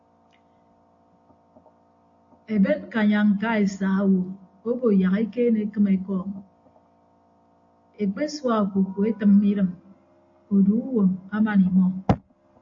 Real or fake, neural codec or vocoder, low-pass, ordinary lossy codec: real; none; 7.2 kHz; AAC, 48 kbps